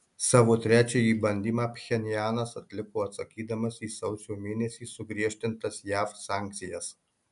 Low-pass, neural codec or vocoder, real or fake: 10.8 kHz; none; real